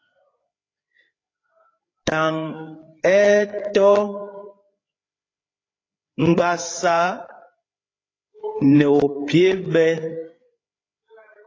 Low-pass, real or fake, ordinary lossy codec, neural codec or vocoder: 7.2 kHz; fake; AAC, 32 kbps; codec, 16 kHz, 8 kbps, FreqCodec, larger model